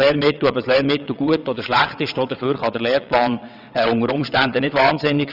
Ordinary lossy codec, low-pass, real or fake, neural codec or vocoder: none; 5.4 kHz; fake; vocoder, 22.05 kHz, 80 mel bands, WaveNeXt